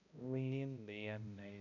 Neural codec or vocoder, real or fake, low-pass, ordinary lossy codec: codec, 16 kHz, 0.5 kbps, X-Codec, HuBERT features, trained on balanced general audio; fake; 7.2 kHz; none